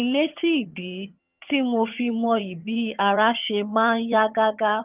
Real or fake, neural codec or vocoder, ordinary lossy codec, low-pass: fake; vocoder, 22.05 kHz, 80 mel bands, HiFi-GAN; Opus, 24 kbps; 3.6 kHz